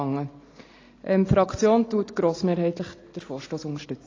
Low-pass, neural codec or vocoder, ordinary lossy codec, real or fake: 7.2 kHz; none; AAC, 32 kbps; real